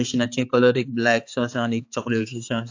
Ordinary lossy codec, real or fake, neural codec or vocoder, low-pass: AAC, 48 kbps; fake; codec, 16 kHz, 4 kbps, X-Codec, HuBERT features, trained on balanced general audio; 7.2 kHz